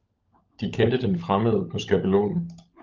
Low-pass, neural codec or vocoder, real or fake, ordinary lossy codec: 7.2 kHz; codec, 16 kHz, 16 kbps, FunCodec, trained on LibriTTS, 50 frames a second; fake; Opus, 24 kbps